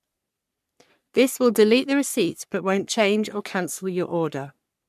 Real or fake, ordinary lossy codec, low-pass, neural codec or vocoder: fake; MP3, 96 kbps; 14.4 kHz; codec, 44.1 kHz, 3.4 kbps, Pupu-Codec